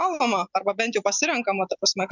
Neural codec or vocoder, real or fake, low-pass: none; real; 7.2 kHz